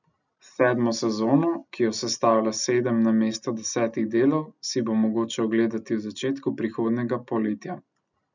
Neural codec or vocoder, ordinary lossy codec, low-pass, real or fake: none; none; 7.2 kHz; real